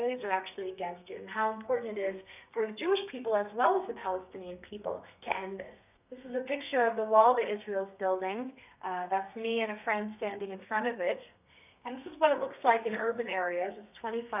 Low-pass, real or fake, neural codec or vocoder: 3.6 kHz; fake; codec, 32 kHz, 1.9 kbps, SNAC